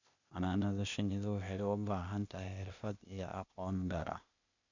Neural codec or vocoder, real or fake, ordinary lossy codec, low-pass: codec, 16 kHz, 0.8 kbps, ZipCodec; fake; none; 7.2 kHz